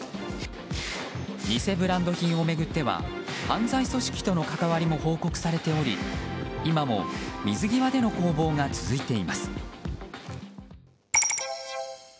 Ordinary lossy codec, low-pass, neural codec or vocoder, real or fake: none; none; none; real